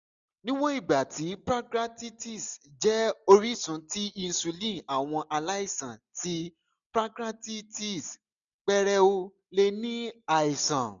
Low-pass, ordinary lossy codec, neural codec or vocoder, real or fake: 7.2 kHz; none; none; real